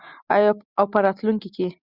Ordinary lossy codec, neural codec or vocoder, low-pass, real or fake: Opus, 64 kbps; none; 5.4 kHz; real